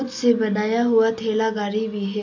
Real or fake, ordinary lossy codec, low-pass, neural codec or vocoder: real; AAC, 48 kbps; 7.2 kHz; none